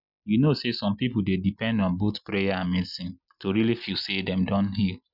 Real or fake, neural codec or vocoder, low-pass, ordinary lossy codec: real; none; 5.4 kHz; none